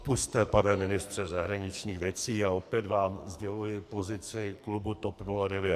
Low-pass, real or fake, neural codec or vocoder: 14.4 kHz; fake; codec, 44.1 kHz, 2.6 kbps, SNAC